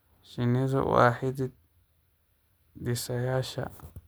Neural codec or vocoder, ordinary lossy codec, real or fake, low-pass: none; none; real; none